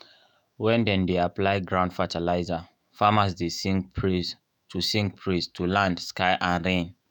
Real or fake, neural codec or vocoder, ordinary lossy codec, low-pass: fake; autoencoder, 48 kHz, 128 numbers a frame, DAC-VAE, trained on Japanese speech; none; none